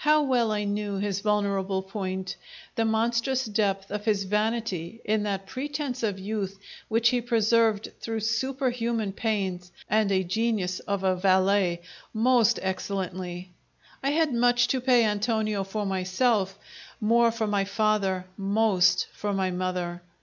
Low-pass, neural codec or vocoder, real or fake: 7.2 kHz; none; real